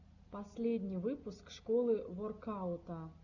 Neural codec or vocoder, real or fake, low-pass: none; real; 7.2 kHz